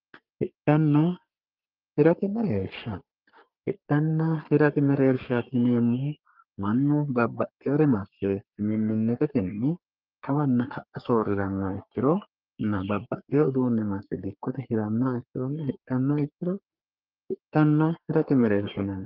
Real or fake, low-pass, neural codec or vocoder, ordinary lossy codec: fake; 5.4 kHz; codec, 44.1 kHz, 3.4 kbps, Pupu-Codec; Opus, 24 kbps